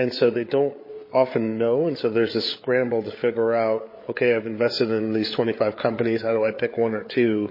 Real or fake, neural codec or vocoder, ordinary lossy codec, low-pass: fake; codec, 16 kHz, 8 kbps, FreqCodec, larger model; MP3, 24 kbps; 5.4 kHz